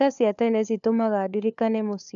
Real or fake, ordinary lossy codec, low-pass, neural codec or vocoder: fake; none; 7.2 kHz; codec, 16 kHz, 4 kbps, FunCodec, trained on LibriTTS, 50 frames a second